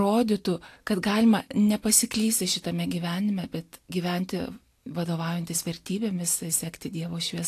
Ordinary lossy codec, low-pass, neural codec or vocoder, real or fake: AAC, 64 kbps; 14.4 kHz; none; real